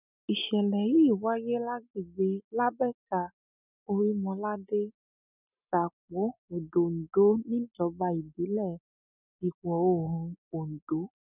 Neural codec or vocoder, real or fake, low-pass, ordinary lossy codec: none; real; 3.6 kHz; none